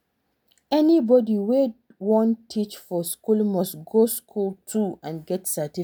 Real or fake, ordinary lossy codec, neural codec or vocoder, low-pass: real; none; none; none